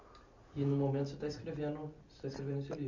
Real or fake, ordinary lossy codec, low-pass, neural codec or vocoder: real; none; 7.2 kHz; none